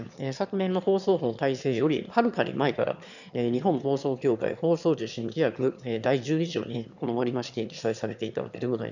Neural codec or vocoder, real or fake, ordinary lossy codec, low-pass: autoencoder, 22.05 kHz, a latent of 192 numbers a frame, VITS, trained on one speaker; fake; none; 7.2 kHz